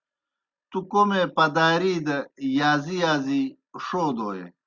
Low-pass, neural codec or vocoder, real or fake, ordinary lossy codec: 7.2 kHz; none; real; Opus, 64 kbps